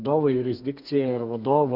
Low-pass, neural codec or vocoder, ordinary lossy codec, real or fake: 5.4 kHz; codec, 32 kHz, 1.9 kbps, SNAC; Opus, 64 kbps; fake